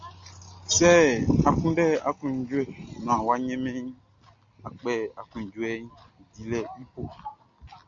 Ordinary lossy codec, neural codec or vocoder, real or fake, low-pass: MP3, 48 kbps; none; real; 7.2 kHz